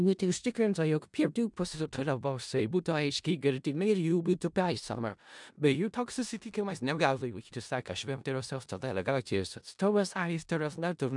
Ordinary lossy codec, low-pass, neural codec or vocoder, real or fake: MP3, 96 kbps; 10.8 kHz; codec, 16 kHz in and 24 kHz out, 0.4 kbps, LongCat-Audio-Codec, four codebook decoder; fake